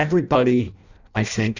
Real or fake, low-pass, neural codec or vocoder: fake; 7.2 kHz; codec, 16 kHz in and 24 kHz out, 0.6 kbps, FireRedTTS-2 codec